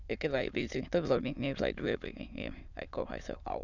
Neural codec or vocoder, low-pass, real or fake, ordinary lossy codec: autoencoder, 22.05 kHz, a latent of 192 numbers a frame, VITS, trained on many speakers; 7.2 kHz; fake; none